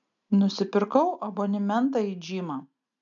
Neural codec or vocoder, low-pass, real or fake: none; 7.2 kHz; real